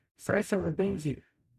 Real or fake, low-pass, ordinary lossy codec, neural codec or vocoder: fake; 14.4 kHz; none; codec, 44.1 kHz, 0.9 kbps, DAC